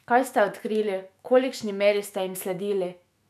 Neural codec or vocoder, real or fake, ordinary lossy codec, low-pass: autoencoder, 48 kHz, 128 numbers a frame, DAC-VAE, trained on Japanese speech; fake; none; 14.4 kHz